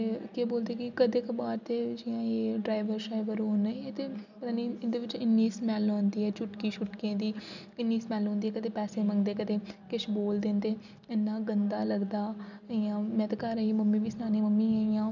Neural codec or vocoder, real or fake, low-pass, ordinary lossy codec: none; real; 7.2 kHz; none